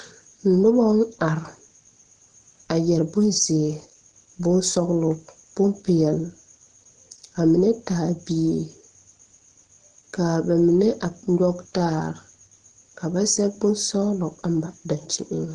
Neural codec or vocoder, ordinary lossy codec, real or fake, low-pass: none; Opus, 16 kbps; real; 9.9 kHz